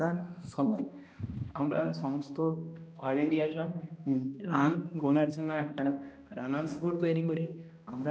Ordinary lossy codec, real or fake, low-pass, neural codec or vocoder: none; fake; none; codec, 16 kHz, 1 kbps, X-Codec, HuBERT features, trained on balanced general audio